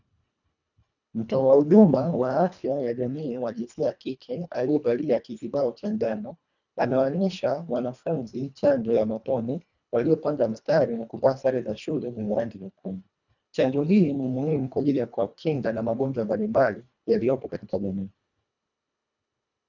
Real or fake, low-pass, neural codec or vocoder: fake; 7.2 kHz; codec, 24 kHz, 1.5 kbps, HILCodec